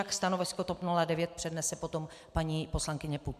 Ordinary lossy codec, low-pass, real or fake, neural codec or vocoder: MP3, 96 kbps; 14.4 kHz; real; none